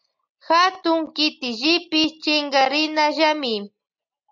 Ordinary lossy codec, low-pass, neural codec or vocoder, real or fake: MP3, 64 kbps; 7.2 kHz; none; real